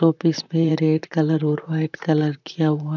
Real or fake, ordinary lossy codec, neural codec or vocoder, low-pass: fake; none; vocoder, 44.1 kHz, 128 mel bands, Pupu-Vocoder; 7.2 kHz